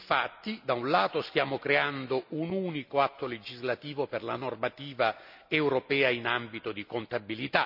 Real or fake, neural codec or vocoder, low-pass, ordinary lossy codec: real; none; 5.4 kHz; none